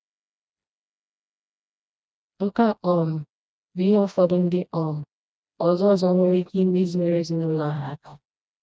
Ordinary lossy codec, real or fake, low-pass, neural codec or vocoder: none; fake; none; codec, 16 kHz, 1 kbps, FreqCodec, smaller model